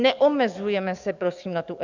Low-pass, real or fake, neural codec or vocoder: 7.2 kHz; fake; codec, 44.1 kHz, 7.8 kbps, DAC